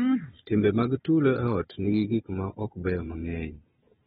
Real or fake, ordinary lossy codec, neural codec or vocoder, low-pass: fake; AAC, 16 kbps; codec, 16 kHz, 4 kbps, FunCodec, trained on Chinese and English, 50 frames a second; 7.2 kHz